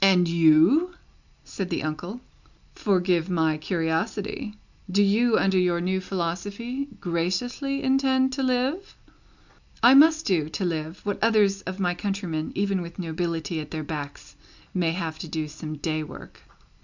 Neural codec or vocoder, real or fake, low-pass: none; real; 7.2 kHz